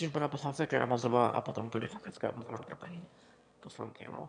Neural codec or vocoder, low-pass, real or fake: autoencoder, 22.05 kHz, a latent of 192 numbers a frame, VITS, trained on one speaker; 9.9 kHz; fake